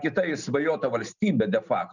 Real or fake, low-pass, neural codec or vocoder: real; 7.2 kHz; none